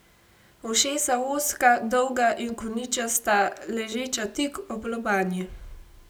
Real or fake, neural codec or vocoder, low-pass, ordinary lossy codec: real; none; none; none